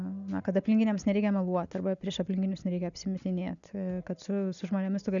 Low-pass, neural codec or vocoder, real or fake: 7.2 kHz; none; real